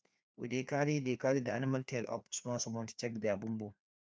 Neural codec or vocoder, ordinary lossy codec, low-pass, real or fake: codec, 16 kHz, 2 kbps, FreqCodec, larger model; none; none; fake